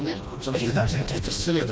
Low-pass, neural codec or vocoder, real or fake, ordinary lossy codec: none; codec, 16 kHz, 1 kbps, FreqCodec, smaller model; fake; none